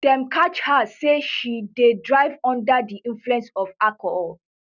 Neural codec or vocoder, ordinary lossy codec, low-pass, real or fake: none; none; 7.2 kHz; real